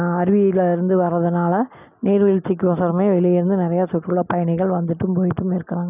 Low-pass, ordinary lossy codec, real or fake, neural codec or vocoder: 3.6 kHz; none; real; none